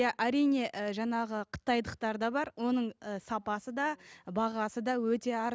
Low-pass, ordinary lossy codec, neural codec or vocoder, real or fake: none; none; none; real